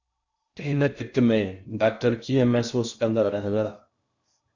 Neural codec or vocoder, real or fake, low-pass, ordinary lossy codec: codec, 16 kHz in and 24 kHz out, 0.6 kbps, FocalCodec, streaming, 2048 codes; fake; 7.2 kHz; Opus, 64 kbps